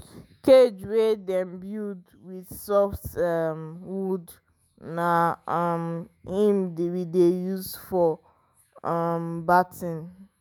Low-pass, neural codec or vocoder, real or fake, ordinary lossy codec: none; autoencoder, 48 kHz, 128 numbers a frame, DAC-VAE, trained on Japanese speech; fake; none